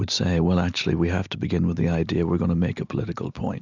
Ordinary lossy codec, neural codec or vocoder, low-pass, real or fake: Opus, 64 kbps; none; 7.2 kHz; real